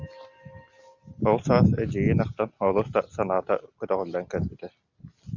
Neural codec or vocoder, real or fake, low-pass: none; real; 7.2 kHz